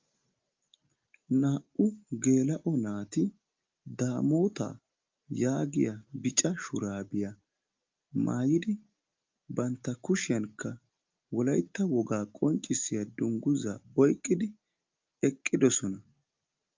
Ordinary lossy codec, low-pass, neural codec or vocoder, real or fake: Opus, 24 kbps; 7.2 kHz; none; real